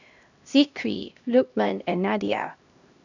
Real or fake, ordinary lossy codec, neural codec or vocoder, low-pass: fake; none; codec, 16 kHz, 1 kbps, X-Codec, HuBERT features, trained on LibriSpeech; 7.2 kHz